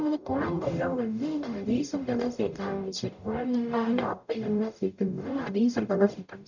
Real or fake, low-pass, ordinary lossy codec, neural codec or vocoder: fake; 7.2 kHz; none; codec, 44.1 kHz, 0.9 kbps, DAC